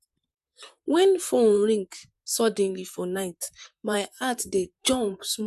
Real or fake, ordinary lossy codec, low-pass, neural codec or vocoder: fake; none; 14.4 kHz; vocoder, 44.1 kHz, 128 mel bands, Pupu-Vocoder